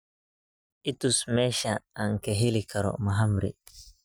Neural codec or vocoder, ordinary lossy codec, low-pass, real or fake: none; none; none; real